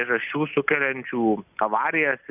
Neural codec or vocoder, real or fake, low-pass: none; real; 3.6 kHz